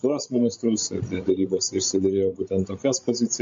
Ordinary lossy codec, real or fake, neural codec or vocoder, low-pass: MP3, 48 kbps; fake; codec, 16 kHz, 16 kbps, FreqCodec, larger model; 7.2 kHz